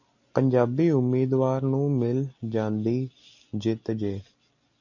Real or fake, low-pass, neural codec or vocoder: real; 7.2 kHz; none